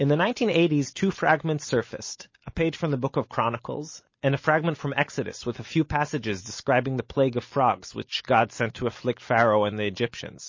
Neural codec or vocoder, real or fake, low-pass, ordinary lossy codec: none; real; 7.2 kHz; MP3, 32 kbps